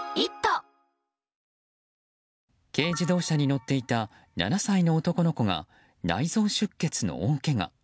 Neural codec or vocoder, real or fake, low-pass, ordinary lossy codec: none; real; none; none